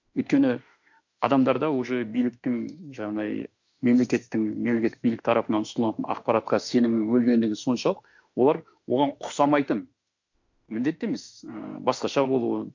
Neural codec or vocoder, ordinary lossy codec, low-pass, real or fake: autoencoder, 48 kHz, 32 numbers a frame, DAC-VAE, trained on Japanese speech; MP3, 64 kbps; 7.2 kHz; fake